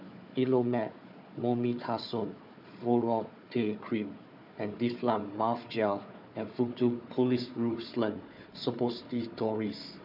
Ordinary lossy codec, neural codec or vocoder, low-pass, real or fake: none; codec, 16 kHz, 4 kbps, FunCodec, trained on Chinese and English, 50 frames a second; 5.4 kHz; fake